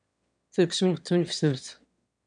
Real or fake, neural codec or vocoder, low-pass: fake; autoencoder, 22.05 kHz, a latent of 192 numbers a frame, VITS, trained on one speaker; 9.9 kHz